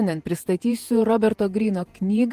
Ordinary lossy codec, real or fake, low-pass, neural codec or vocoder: Opus, 24 kbps; fake; 14.4 kHz; vocoder, 48 kHz, 128 mel bands, Vocos